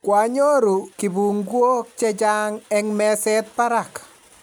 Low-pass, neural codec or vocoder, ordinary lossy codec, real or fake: none; none; none; real